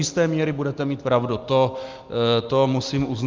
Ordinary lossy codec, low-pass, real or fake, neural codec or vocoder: Opus, 24 kbps; 7.2 kHz; real; none